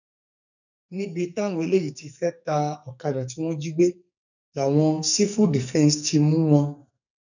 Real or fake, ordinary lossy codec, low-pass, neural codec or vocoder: fake; none; 7.2 kHz; codec, 44.1 kHz, 2.6 kbps, SNAC